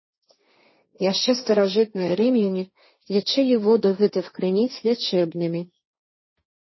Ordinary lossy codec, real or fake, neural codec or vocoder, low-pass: MP3, 24 kbps; fake; codec, 16 kHz, 1.1 kbps, Voila-Tokenizer; 7.2 kHz